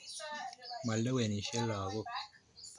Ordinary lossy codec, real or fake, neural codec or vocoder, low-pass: MP3, 64 kbps; real; none; 10.8 kHz